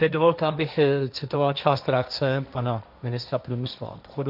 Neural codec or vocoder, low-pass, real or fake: codec, 16 kHz, 1.1 kbps, Voila-Tokenizer; 5.4 kHz; fake